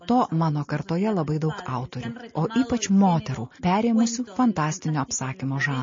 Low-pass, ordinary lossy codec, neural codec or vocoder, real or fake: 7.2 kHz; MP3, 32 kbps; none; real